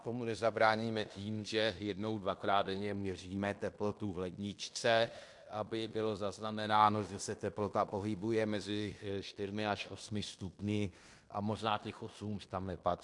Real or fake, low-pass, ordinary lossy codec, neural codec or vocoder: fake; 10.8 kHz; MP3, 64 kbps; codec, 16 kHz in and 24 kHz out, 0.9 kbps, LongCat-Audio-Codec, fine tuned four codebook decoder